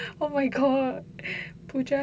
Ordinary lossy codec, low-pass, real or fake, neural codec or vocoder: none; none; real; none